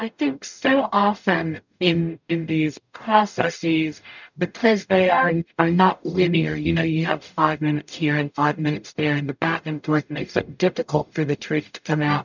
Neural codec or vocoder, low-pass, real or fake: codec, 44.1 kHz, 0.9 kbps, DAC; 7.2 kHz; fake